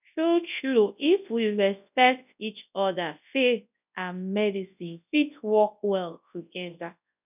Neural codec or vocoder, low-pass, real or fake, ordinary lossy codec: codec, 24 kHz, 0.9 kbps, WavTokenizer, large speech release; 3.6 kHz; fake; none